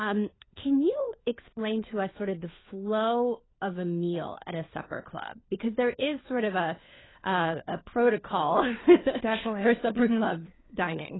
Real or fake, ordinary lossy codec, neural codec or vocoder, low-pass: fake; AAC, 16 kbps; codec, 16 kHz, 4 kbps, FunCodec, trained on LibriTTS, 50 frames a second; 7.2 kHz